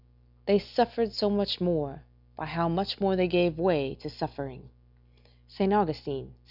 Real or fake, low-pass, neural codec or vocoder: real; 5.4 kHz; none